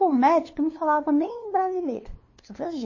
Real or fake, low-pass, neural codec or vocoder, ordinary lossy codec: fake; 7.2 kHz; codec, 16 kHz, 2 kbps, FunCodec, trained on LibriTTS, 25 frames a second; MP3, 32 kbps